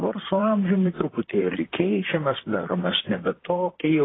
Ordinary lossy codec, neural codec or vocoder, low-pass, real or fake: AAC, 16 kbps; vocoder, 44.1 kHz, 128 mel bands, Pupu-Vocoder; 7.2 kHz; fake